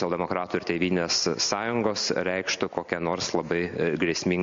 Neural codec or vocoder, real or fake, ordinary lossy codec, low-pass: none; real; MP3, 48 kbps; 7.2 kHz